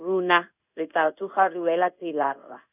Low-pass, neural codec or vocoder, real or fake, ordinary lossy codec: 3.6 kHz; codec, 16 kHz in and 24 kHz out, 1 kbps, XY-Tokenizer; fake; none